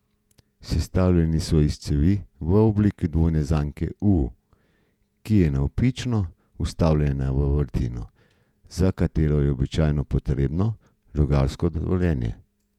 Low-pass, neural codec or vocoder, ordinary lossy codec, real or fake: 19.8 kHz; vocoder, 48 kHz, 128 mel bands, Vocos; none; fake